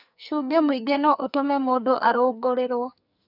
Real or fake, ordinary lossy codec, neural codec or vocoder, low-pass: fake; none; codec, 44.1 kHz, 2.6 kbps, SNAC; 5.4 kHz